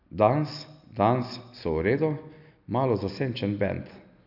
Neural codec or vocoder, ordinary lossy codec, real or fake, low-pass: none; none; real; 5.4 kHz